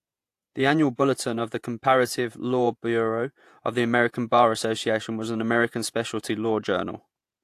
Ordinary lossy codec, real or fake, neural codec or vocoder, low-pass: AAC, 64 kbps; fake; vocoder, 48 kHz, 128 mel bands, Vocos; 14.4 kHz